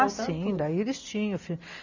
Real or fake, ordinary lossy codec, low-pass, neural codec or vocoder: real; none; 7.2 kHz; none